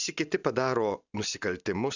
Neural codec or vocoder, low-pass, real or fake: none; 7.2 kHz; real